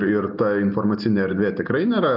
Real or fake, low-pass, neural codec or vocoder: real; 5.4 kHz; none